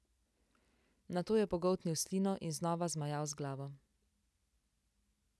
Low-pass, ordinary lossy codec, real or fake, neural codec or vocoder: none; none; real; none